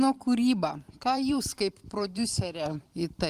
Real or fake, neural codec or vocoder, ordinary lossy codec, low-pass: fake; vocoder, 44.1 kHz, 128 mel bands every 512 samples, BigVGAN v2; Opus, 32 kbps; 14.4 kHz